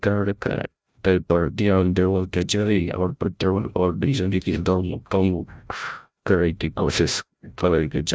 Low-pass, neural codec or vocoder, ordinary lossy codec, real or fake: none; codec, 16 kHz, 0.5 kbps, FreqCodec, larger model; none; fake